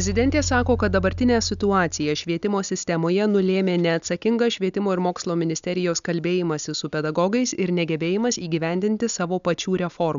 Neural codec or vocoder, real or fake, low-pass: none; real; 7.2 kHz